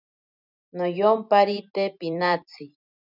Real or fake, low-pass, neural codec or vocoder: fake; 5.4 kHz; vocoder, 44.1 kHz, 128 mel bands every 256 samples, BigVGAN v2